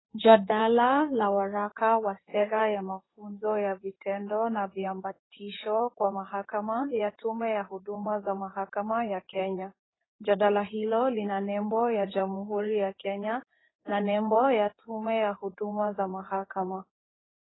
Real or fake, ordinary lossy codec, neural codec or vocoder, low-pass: fake; AAC, 16 kbps; codec, 16 kHz in and 24 kHz out, 2.2 kbps, FireRedTTS-2 codec; 7.2 kHz